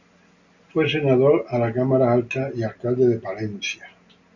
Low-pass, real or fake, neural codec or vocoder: 7.2 kHz; real; none